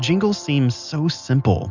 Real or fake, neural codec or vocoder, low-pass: real; none; 7.2 kHz